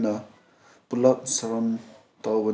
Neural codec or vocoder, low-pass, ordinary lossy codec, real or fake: none; none; none; real